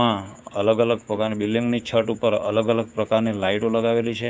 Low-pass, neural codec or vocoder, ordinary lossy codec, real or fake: none; codec, 16 kHz, 6 kbps, DAC; none; fake